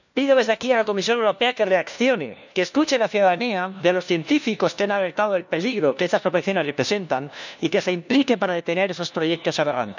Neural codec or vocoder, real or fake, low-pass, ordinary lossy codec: codec, 16 kHz, 1 kbps, FunCodec, trained on LibriTTS, 50 frames a second; fake; 7.2 kHz; none